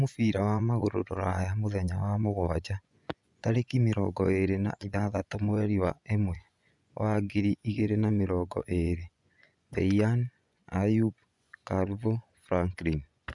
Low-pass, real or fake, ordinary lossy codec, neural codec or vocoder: 10.8 kHz; fake; none; vocoder, 24 kHz, 100 mel bands, Vocos